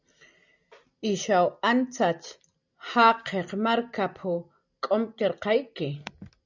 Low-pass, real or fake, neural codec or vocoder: 7.2 kHz; real; none